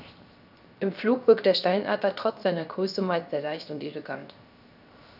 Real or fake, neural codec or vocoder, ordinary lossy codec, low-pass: fake; codec, 16 kHz, 0.7 kbps, FocalCodec; none; 5.4 kHz